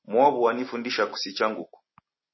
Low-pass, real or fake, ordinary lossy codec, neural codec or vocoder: 7.2 kHz; real; MP3, 24 kbps; none